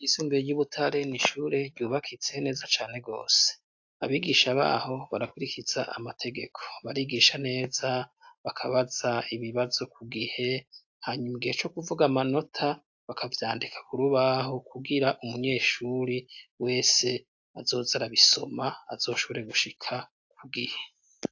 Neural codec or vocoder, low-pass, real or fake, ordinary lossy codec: none; 7.2 kHz; real; AAC, 48 kbps